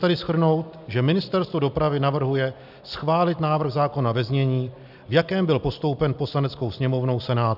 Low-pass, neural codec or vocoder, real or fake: 5.4 kHz; none; real